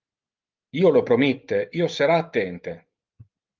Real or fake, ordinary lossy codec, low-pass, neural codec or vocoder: real; Opus, 32 kbps; 7.2 kHz; none